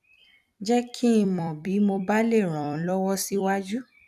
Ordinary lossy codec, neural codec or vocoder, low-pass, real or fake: none; vocoder, 44.1 kHz, 128 mel bands every 256 samples, BigVGAN v2; 14.4 kHz; fake